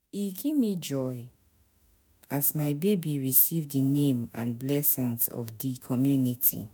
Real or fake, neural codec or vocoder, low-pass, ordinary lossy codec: fake; autoencoder, 48 kHz, 32 numbers a frame, DAC-VAE, trained on Japanese speech; none; none